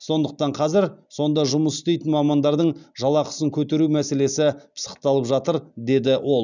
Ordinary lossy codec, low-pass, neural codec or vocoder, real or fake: none; 7.2 kHz; none; real